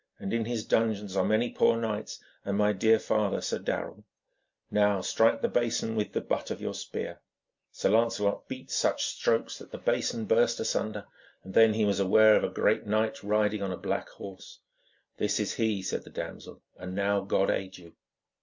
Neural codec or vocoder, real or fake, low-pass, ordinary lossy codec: none; real; 7.2 kHz; MP3, 64 kbps